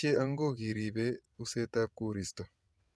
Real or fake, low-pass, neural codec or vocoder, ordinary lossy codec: fake; none; vocoder, 22.05 kHz, 80 mel bands, WaveNeXt; none